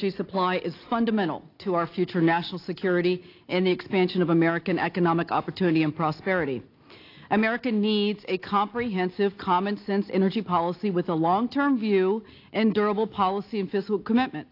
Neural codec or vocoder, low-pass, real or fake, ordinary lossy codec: none; 5.4 kHz; real; AAC, 32 kbps